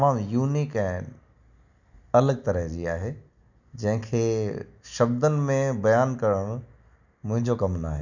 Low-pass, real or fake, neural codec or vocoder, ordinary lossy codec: 7.2 kHz; real; none; none